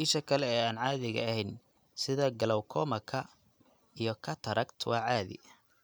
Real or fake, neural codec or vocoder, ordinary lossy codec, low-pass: real; none; none; none